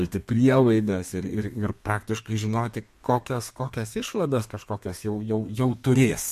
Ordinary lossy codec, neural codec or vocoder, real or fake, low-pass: MP3, 64 kbps; codec, 32 kHz, 1.9 kbps, SNAC; fake; 14.4 kHz